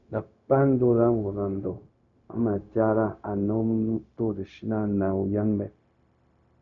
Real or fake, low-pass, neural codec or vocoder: fake; 7.2 kHz; codec, 16 kHz, 0.4 kbps, LongCat-Audio-Codec